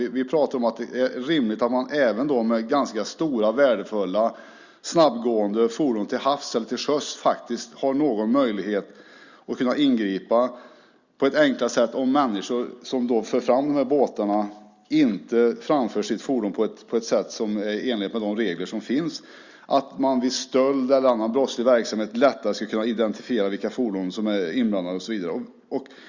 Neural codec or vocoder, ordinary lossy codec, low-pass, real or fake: none; Opus, 64 kbps; 7.2 kHz; real